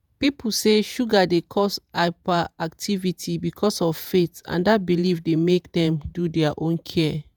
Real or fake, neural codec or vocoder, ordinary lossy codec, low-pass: fake; vocoder, 48 kHz, 128 mel bands, Vocos; none; none